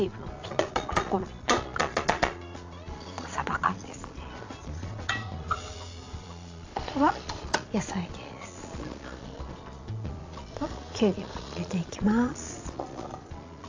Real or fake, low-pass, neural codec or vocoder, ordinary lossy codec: fake; 7.2 kHz; vocoder, 22.05 kHz, 80 mel bands, Vocos; AAC, 48 kbps